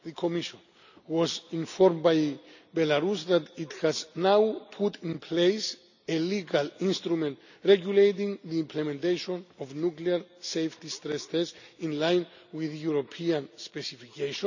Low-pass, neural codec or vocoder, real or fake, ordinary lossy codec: 7.2 kHz; none; real; none